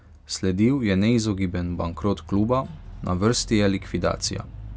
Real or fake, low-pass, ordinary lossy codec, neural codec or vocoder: real; none; none; none